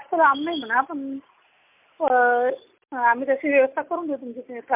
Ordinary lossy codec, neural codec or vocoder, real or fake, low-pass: MP3, 32 kbps; none; real; 3.6 kHz